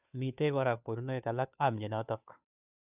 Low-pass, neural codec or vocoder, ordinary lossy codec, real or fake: 3.6 kHz; codec, 16 kHz, 2 kbps, FunCodec, trained on Chinese and English, 25 frames a second; none; fake